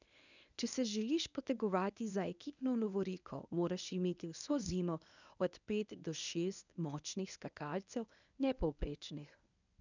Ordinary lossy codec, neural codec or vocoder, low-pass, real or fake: none; codec, 24 kHz, 0.9 kbps, WavTokenizer, medium speech release version 1; 7.2 kHz; fake